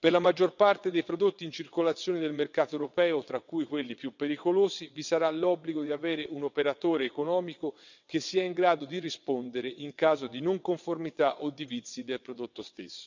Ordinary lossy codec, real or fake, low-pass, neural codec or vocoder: none; fake; 7.2 kHz; vocoder, 22.05 kHz, 80 mel bands, WaveNeXt